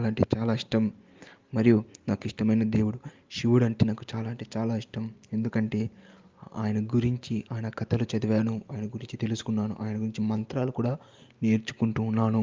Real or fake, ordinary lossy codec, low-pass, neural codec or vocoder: real; Opus, 32 kbps; 7.2 kHz; none